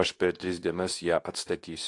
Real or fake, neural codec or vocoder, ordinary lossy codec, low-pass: fake; codec, 24 kHz, 0.9 kbps, WavTokenizer, medium speech release version 2; AAC, 48 kbps; 10.8 kHz